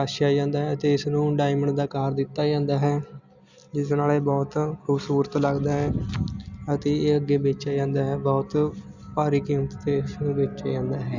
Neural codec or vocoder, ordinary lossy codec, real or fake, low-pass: none; Opus, 64 kbps; real; 7.2 kHz